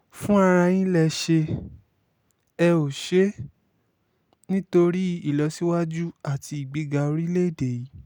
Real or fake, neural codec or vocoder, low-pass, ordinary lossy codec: real; none; none; none